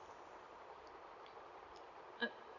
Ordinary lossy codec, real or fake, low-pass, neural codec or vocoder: none; real; 7.2 kHz; none